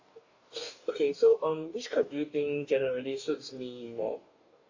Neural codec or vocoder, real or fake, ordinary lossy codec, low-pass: codec, 44.1 kHz, 2.6 kbps, DAC; fake; AAC, 48 kbps; 7.2 kHz